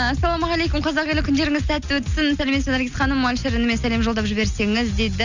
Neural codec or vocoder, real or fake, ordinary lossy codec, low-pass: none; real; none; 7.2 kHz